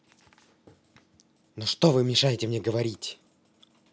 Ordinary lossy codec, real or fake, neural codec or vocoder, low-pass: none; real; none; none